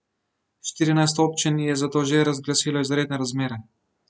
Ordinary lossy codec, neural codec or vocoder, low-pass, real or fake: none; none; none; real